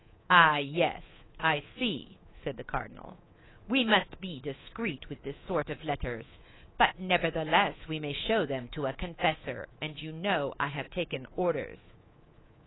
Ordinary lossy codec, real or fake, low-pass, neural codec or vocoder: AAC, 16 kbps; fake; 7.2 kHz; codec, 24 kHz, 3.1 kbps, DualCodec